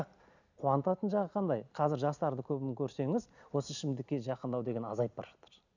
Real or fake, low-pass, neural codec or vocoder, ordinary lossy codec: real; 7.2 kHz; none; none